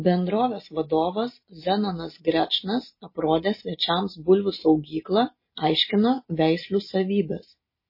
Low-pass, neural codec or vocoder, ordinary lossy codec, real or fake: 5.4 kHz; none; MP3, 24 kbps; real